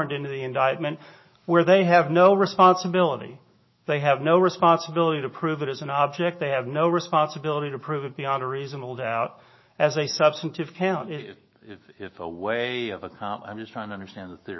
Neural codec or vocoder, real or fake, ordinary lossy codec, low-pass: autoencoder, 48 kHz, 128 numbers a frame, DAC-VAE, trained on Japanese speech; fake; MP3, 24 kbps; 7.2 kHz